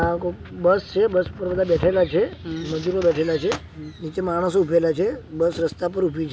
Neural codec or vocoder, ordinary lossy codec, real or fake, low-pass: none; none; real; none